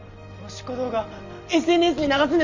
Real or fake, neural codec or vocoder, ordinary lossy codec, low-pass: real; none; Opus, 32 kbps; 7.2 kHz